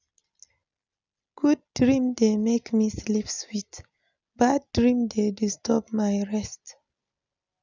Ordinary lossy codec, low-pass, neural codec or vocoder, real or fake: none; 7.2 kHz; none; real